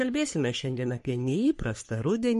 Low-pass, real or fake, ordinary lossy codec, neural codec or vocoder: 14.4 kHz; fake; MP3, 48 kbps; codec, 44.1 kHz, 3.4 kbps, Pupu-Codec